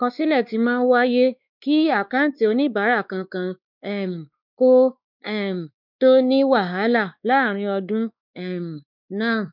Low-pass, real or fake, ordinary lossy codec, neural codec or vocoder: 5.4 kHz; fake; none; codec, 16 kHz, 2 kbps, X-Codec, WavLM features, trained on Multilingual LibriSpeech